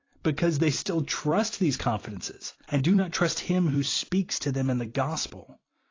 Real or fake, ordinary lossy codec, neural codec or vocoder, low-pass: fake; AAC, 32 kbps; vocoder, 44.1 kHz, 128 mel bands every 256 samples, BigVGAN v2; 7.2 kHz